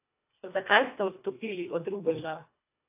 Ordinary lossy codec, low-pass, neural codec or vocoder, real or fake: AAC, 24 kbps; 3.6 kHz; codec, 24 kHz, 1.5 kbps, HILCodec; fake